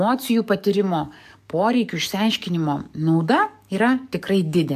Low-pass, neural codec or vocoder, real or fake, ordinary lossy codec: 14.4 kHz; codec, 44.1 kHz, 7.8 kbps, DAC; fake; AAC, 96 kbps